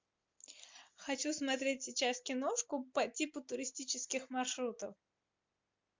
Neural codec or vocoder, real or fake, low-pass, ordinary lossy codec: none; real; 7.2 kHz; MP3, 64 kbps